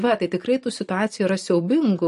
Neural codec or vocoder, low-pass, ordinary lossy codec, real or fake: none; 14.4 kHz; MP3, 48 kbps; real